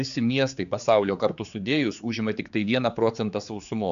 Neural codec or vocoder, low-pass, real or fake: codec, 16 kHz, 4 kbps, X-Codec, HuBERT features, trained on general audio; 7.2 kHz; fake